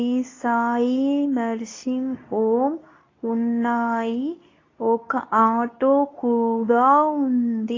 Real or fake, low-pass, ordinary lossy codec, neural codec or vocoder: fake; 7.2 kHz; AAC, 32 kbps; codec, 24 kHz, 0.9 kbps, WavTokenizer, medium speech release version 2